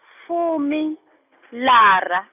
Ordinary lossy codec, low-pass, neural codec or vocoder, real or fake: none; 3.6 kHz; none; real